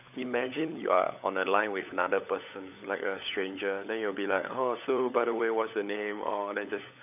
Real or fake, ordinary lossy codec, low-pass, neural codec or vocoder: fake; none; 3.6 kHz; codec, 16 kHz, 16 kbps, FunCodec, trained on LibriTTS, 50 frames a second